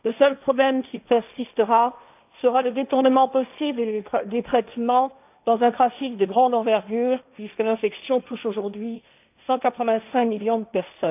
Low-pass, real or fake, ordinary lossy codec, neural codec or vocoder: 3.6 kHz; fake; none; codec, 16 kHz, 1.1 kbps, Voila-Tokenizer